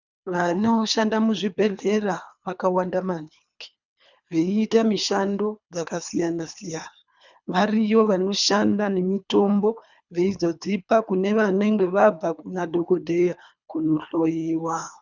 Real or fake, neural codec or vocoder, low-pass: fake; codec, 24 kHz, 3 kbps, HILCodec; 7.2 kHz